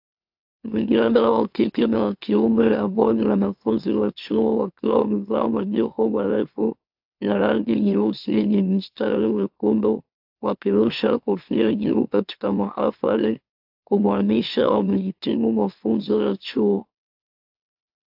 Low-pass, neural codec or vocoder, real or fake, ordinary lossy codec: 5.4 kHz; autoencoder, 44.1 kHz, a latent of 192 numbers a frame, MeloTTS; fake; AAC, 48 kbps